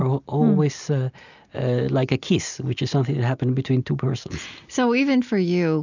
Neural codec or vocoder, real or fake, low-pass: none; real; 7.2 kHz